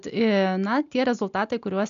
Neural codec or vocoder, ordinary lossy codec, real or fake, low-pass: none; AAC, 96 kbps; real; 7.2 kHz